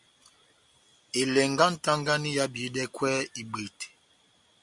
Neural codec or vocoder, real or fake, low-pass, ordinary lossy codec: none; real; 10.8 kHz; Opus, 64 kbps